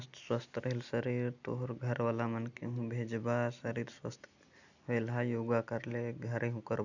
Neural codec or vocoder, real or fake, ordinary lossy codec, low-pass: none; real; none; 7.2 kHz